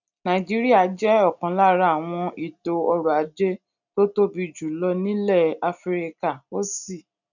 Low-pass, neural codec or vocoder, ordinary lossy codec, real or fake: 7.2 kHz; none; none; real